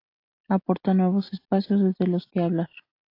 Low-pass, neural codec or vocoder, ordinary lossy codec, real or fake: 5.4 kHz; none; AAC, 32 kbps; real